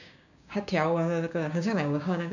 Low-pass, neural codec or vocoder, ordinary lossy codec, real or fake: 7.2 kHz; codec, 16 kHz, 6 kbps, DAC; none; fake